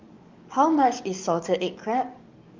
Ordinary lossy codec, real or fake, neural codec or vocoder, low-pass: Opus, 24 kbps; fake; codec, 44.1 kHz, 7.8 kbps, DAC; 7.2 kHz